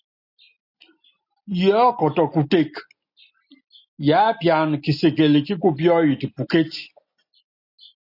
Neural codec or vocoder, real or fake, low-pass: none; real; 5.4 kHz